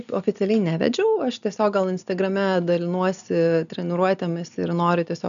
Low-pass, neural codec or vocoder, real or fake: 7.2 kHz; none; real